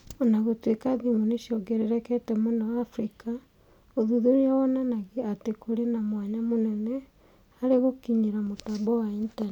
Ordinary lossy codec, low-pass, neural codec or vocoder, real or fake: none; 19.8 kHz; none; real